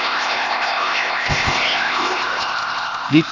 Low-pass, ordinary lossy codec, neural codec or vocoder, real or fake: 7.2 kHz; none; codec, 16 kHz, 0.8 kbps, ZipCodec; fake